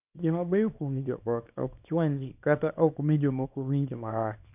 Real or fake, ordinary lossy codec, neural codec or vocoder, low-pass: fake; none; codec, 24 kHz, 0.9 kbps, WavTokenizer, small release; 3.6 kHz